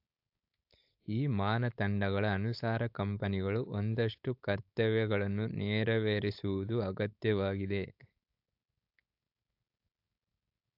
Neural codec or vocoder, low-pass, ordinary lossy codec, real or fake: codec, 16 kHz, 4.8 kbps, FACodec; 5.4 kHz; AAC, 48 kbps; fake